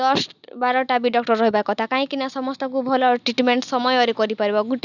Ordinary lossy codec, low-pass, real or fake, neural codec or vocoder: none; 7.2 kHz; real; none